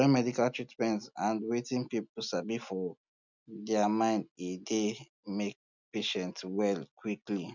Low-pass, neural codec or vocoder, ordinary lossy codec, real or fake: 7.2 kHz; none; none; real